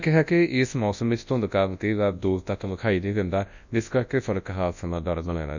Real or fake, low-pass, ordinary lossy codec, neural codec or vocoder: fake; 7.2 kHz; none; codec, 24 kHz, 0.9 kbps, WavTokenizer, large speech release